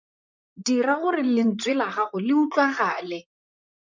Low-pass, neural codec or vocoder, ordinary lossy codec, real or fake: 7.2 kHz; vocoder, 44.1 kHz, 128 mel bands, Pupu-Vocoder; MP3, 64 kbps; fake